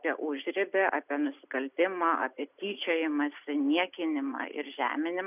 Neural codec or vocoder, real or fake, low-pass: none; real; 3.6 kHz